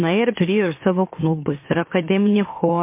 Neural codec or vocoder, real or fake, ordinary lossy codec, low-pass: autoencoder, 44.1 kHz, a latent of 192 numbers a frame, MeloTTS; fake; MP3, 24 kbps; 3.6 kHz